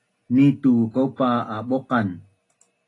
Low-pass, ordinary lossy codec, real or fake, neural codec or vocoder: 10.8 kHz; AAC, 32 kbps; real; none